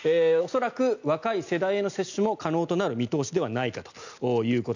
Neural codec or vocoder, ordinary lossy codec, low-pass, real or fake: none; none; 7.2 kHz; real